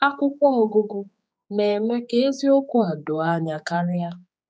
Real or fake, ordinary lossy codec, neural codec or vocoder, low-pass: fake; none; codec, 16 kHz, 4 kbps, X-Codec, HuBERT features, trained on general audio; none